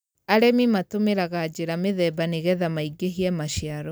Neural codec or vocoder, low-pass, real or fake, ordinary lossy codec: none; none; real; none